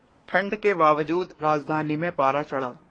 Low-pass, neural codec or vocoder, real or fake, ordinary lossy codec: 9.9 kHz; codec, 24 kHz, 1 kbps, SNAC; fake; AAC, 32 kbps